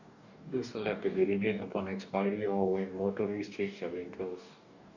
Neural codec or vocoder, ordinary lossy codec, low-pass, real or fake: codec, 44.1 kHz, 2.6 kbps, DAC; none; 7.2 kHz; fake